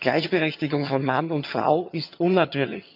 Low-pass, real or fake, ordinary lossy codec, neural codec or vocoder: 5.4 kHz; fake; MP3, 48 kbps; vocoder, 22.05 kHz, 80 mel bands, HiFi-GAN